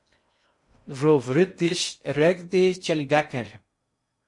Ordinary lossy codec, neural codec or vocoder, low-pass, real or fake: MP3, 48 kbps; codec, 16 kHz in and 24 kHz out, 0.6 kbps, FocalCodec, streaming, 2048 codes; 10.8 kHz; fake